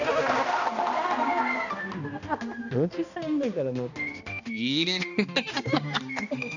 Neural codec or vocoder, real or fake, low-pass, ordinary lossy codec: codec, 16 kHz, 1 kbps, X-Codec, HuBERT features, trained on balanced general audio; fake; 7.2 kHz; none